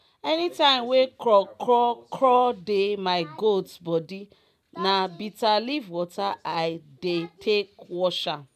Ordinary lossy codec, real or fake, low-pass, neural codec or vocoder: none; real; 14.4 kHz; none